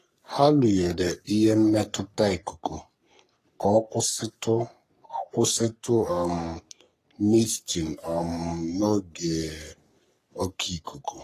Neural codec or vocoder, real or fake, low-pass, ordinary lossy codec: codec, 44.1 kHz, 3.4 kbps, Pupu-Codec; fake; 14.4 kHz; AAC, 48 kbps